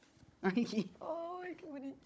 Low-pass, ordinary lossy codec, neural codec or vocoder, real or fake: none; none; codec, 16 kHz, 16 kbps, FunCodec, trained on Chinese and English, 50 frames a second; fake